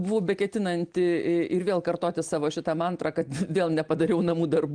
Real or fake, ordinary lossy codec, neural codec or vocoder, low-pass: real; Opus, 32 kbps; none; 9.9 kHz